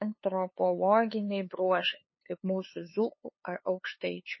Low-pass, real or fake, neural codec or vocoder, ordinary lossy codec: 7.2 kHz; fake; codec, 16 kHz, 2 kbps, FunCodec, trained on LibriTTS, 25 frames a second; MP3, 24 kbps